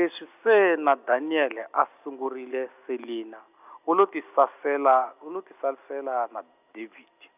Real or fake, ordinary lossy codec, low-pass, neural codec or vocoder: real; none; 3.6 kHz; none